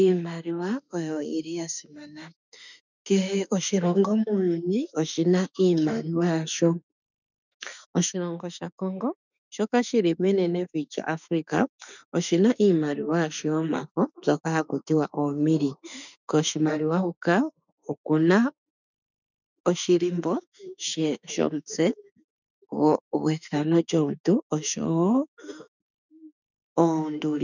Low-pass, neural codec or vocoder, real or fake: 7.2 kHz; autoencoder, 48 kHz, 32 numbers a frame, DAC-VAE, trained on Japanese speech; fake